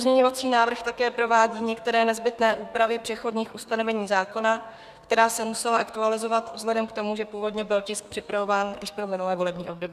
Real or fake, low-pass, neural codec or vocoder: fake; 14.4 kHz; codec, 32 kHz, 1.9 kbps, SNAC